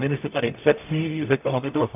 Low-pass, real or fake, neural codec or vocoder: 3.6 kHz; fake; codec, 44.1 kHz, 0.9 kbps, DAC